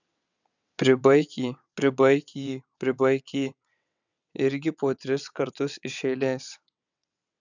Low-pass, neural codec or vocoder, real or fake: 7.2 kHz; vocoder, 22.05 kHz, 80 mel bands, WaveNeXt; fake